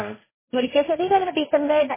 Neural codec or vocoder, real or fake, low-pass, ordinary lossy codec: codec, 16 kHz, 1.1 kbps, Voila-Tokenizer; fake; 3.6 kHz; MP3, 16 kbps